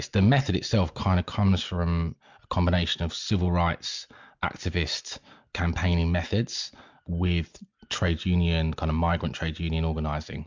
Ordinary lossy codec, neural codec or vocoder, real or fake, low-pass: MP3, 64 kbps; none; real; 7.2 kHz